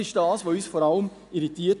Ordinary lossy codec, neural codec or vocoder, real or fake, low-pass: none; none; real; 10.8 kHz